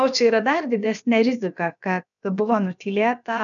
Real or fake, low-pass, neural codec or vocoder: fake; 7.2 kHz; codec, 16 kHz, about 1 kbps, DyCAST, with the encoder's durations